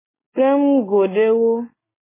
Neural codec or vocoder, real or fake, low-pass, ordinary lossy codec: none; real; 3.6 kHz; MP3, 16 kbps